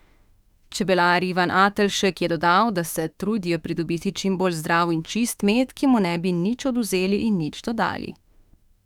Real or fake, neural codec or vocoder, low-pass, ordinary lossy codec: fake; autoencoder, 48 kHz, 32 numbers a frame, DAC-VAE, trained on Japanese speech; 19.8 kHz; none